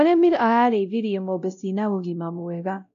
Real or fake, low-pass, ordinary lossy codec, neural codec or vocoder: fake; 7.2 kHz; none; codec, 16 kHz, 0.5 kbps, X-Codec, WavLM features, trained on Multilingual LibriSpeech